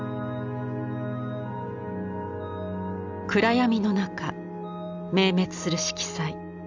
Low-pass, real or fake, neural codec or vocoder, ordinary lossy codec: 7.2 kHz; real; none; none